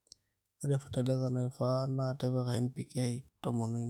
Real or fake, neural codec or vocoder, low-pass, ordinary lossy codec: fake; autoencoder, 48 kHz, 32 numbers a frame, DAC-VAE, trained on Japanese speech; 19.8 kHz; none